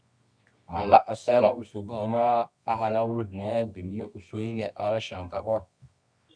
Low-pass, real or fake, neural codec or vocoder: 9.9 kHz; fake; codec, 24 kHz, 0.9 kbps, WavTokenizer, medium music audio release